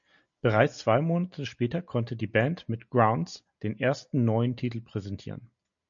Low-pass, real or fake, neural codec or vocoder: 7.2 kHz; real; none